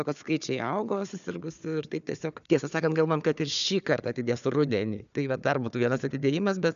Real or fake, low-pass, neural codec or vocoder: fake; 7.2 kHz; codec, 16 kHz, 4 kbps, FunCodec, trained on Chinese and English, 50 frames a second